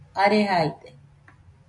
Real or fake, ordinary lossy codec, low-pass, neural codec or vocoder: real; MP3, 96 kbps; 10.8 kHz; none